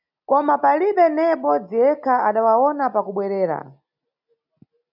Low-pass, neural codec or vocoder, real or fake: 5.4 kHz; none; real